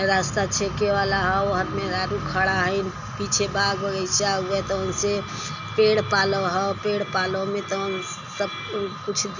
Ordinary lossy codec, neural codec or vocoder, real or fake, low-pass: none; none; real; 7.2 kHz